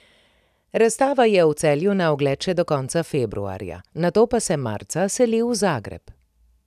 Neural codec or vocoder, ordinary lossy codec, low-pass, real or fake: none; none; 14.4 kHz; real